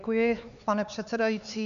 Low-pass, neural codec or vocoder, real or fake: 7.2 kHz; codec, 16 kHz, 4 kbps, X-Codec, HuBERT features, trained on LibriSpeech; fake